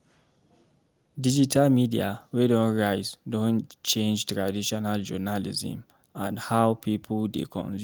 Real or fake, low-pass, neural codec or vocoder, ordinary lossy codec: real; 19.8 kHz; none; Opus, 32 kbps